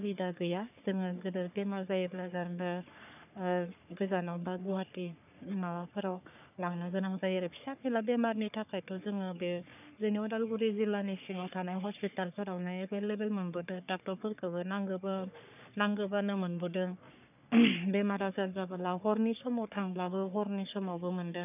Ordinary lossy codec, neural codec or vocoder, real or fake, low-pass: none; codec, 44.1 kHz, 3.4 kbps, Pupu-Codec; fake; 3.6 kHz